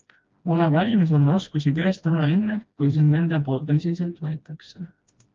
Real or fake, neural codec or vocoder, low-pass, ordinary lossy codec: fake; codec, 16 kHz, 1 kbps, FreqCodec, smaller model; 7.2 kHz; Opus, 24 kbps